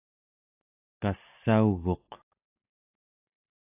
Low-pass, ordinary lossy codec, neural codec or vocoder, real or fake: 3.6 kHz; AAC, 24 kbps; none; real